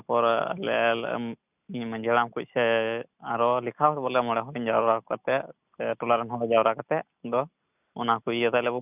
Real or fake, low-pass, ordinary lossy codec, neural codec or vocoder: real; 3.6 kHz; none; none